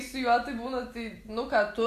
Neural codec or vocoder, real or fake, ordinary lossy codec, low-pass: none; real; Opus, 64 kbps; 14.4 kHz